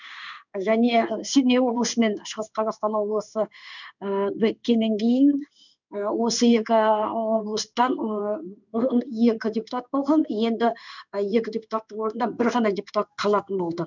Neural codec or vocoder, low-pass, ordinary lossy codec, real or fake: codec, 16 kHz in and 24 kHz out, 1 kbps, XY-Tokenizer; 7.2 kHz; none; fake